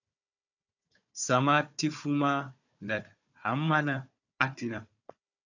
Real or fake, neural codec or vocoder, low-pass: fake; codec, 16 kHz, 4 kbps, FunCodec, trained on Chinese and English, 50 frames a second; 7.2 kHz